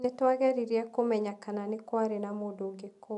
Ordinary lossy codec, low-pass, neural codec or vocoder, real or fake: none; 10.8 kHz; none; real